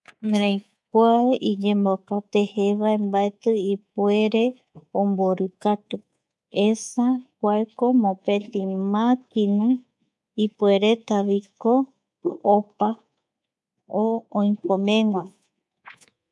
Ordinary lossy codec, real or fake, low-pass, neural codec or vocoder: none; fake; none; codec, 24 kHz, 3.1 kbps, DualCodec